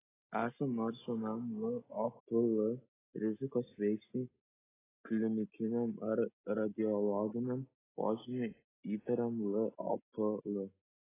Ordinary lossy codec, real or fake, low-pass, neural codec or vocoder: AAC, 16 kbps; real; 3.6 kHz; none